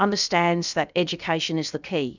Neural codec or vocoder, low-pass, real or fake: codec, 16 kHz, about 1 kbps, DyCAST, with the encoder's durations; 7.2 kHz; fake